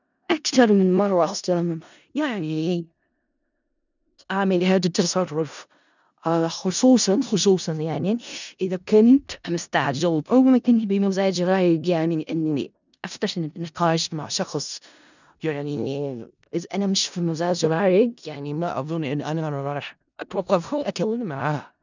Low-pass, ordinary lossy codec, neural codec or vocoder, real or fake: 7.2 kHz; none; codec, 16 kHz in and 24 kHz out, 0.4 kbps, LongCat-Audio-Codec, four codebook decoder; fake